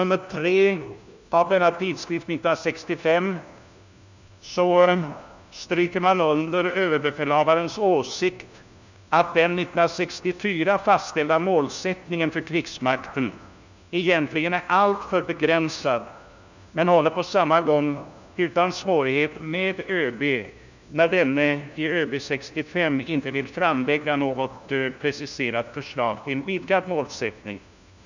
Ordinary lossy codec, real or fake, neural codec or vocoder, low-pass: none; fake; codec, 16 kHz, 1 kbps, FunCodec, trained on LibriTTS, 50 frames a second; 7.2 kHz